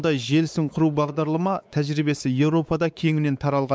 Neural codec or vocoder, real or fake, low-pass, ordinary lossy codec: codec, 16 kHz, 4 kbps, X-Codec, WavLM features, trained on Multilingual LibriSpeech; fake; none; none